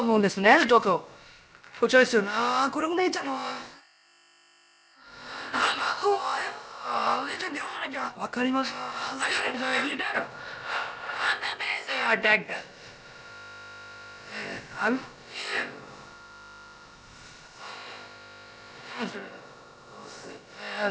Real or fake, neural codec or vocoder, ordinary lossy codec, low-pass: fake; codec, 16 kHz, about 1 kbps, DyCAST, with the encoder's durations; none; none